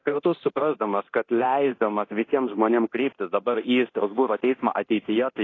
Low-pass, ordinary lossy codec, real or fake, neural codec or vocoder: 7.2 kHz; AAC, 32 kbps; fake; codec, 24 kHz, 0.9 kbps, DualCodec